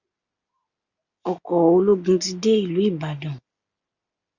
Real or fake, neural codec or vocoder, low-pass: real; none; 7.2 kHz